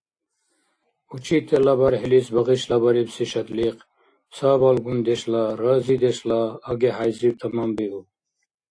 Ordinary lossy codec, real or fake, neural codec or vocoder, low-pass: AAC, 48 kbps; real; none; 9.9 kHz